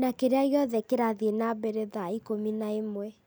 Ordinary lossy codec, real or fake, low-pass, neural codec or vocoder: none; real; none; none